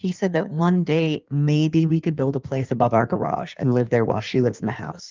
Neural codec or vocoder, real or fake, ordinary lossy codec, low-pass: codec, 16 kHz in and 24 kHz out, 1.1 kbps, FireRedTTS-2 codec; fake; Opus, 24 kbps; 7.2 kHz